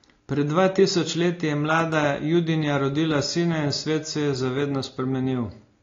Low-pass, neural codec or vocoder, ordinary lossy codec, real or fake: 7.2 kHz; none; AAC, 32 kbps; real